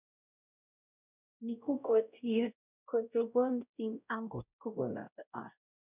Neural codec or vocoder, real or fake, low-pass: codec, 16 kHz, 0.5 kbps, X-Codec, WavLM features, trained on Multilingual LibriSpeech; fake; 3.6 kHz